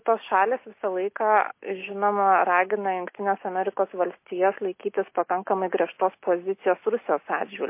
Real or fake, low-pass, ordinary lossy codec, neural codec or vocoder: real; 3.6 kHz; MP3, 24 kbps; none